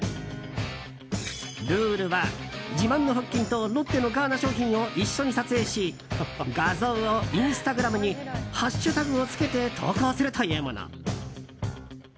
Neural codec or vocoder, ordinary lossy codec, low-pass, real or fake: none; none; none; real